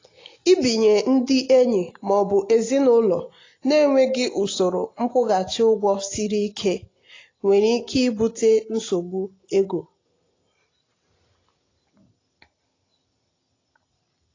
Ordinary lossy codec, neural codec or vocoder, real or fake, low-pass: AAC, 32 kbps; none; real; 7.2 kHz